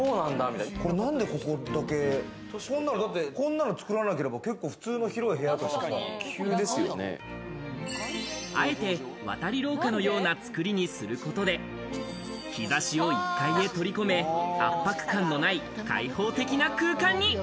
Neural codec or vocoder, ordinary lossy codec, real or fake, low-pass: none; none; real; none